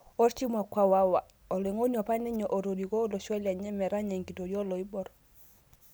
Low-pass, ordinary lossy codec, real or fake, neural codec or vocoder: none; none; real; none